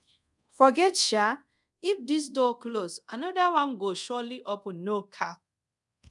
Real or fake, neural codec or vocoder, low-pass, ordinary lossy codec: fake; codec, 24 kHz, 0.5 kbps, DualCodec; 10.8 kHz; none